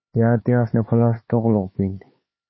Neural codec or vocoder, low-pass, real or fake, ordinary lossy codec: codec, 16 kHz, 4 kbps, X-Codec, HuBERT features, trained on LibriSpeech; 7.2 kHz; fake; MP3, 24 kbps